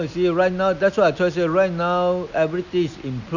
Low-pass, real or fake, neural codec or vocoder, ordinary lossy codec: 7.2 kHz; real; none; none